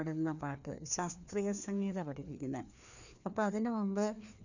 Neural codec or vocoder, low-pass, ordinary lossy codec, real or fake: codec, 16 kHz, 2 kbps, FreqCodec, larger model; 7.2 kHz; none; fake